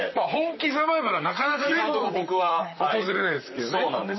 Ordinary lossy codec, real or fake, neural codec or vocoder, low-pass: MP3, 24 kbps; fake; vocoder, 44.1 kHz, 128 mel bands, Pupu-Vocoder; 7.2 kHz